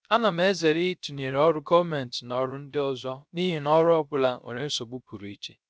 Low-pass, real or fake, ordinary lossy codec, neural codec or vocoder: none; fake; none; codec, 16 kHz, 0.3 kbps, FocalCodec